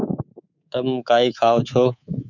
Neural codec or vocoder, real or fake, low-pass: codec, 24 kHz, 3.1 kbps, DualCodec; fake; 7.2 kHz